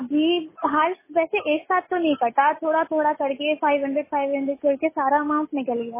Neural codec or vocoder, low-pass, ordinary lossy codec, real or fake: none; 3.6 kHz; MP3, 16 kbps; real